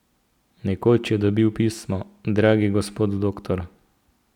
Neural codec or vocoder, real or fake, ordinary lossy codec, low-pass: none; real; none; 19.8 kHz